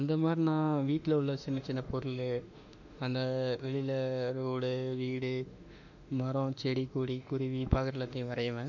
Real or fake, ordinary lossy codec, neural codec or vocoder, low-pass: fake; none; autoencoder, 48 kHz, 32 numbers a frame, DAC-VAE, trained on Japanese speech; 7.2 kHz